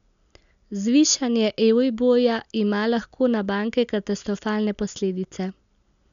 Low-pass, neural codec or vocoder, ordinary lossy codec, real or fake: 7.2 kHz; none; none; real